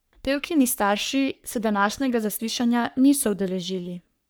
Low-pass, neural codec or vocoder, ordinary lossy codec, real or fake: none; codec, 44.1 kHz, 3.4 kbps, Pupu-Codec; none; fake